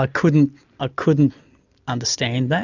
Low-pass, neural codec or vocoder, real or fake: 7.2 kHz; none; real